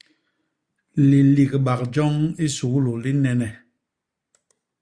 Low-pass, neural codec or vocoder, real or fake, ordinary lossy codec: 9.9 kHz; none; real; Opus, 64 kbps